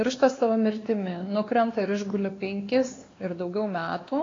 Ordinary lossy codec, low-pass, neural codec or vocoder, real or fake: AAC, 32 kbps; 7.2 kHz; codec, 16 kHz, 2 kbps, X-Codec, WavLM features, trained on Multilingual LibriSpeech; fake